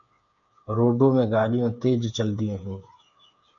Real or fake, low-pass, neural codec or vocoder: fake; 7.2 kHz; codec, 16 kHz, 8 kbps, FreqCodec, smaller model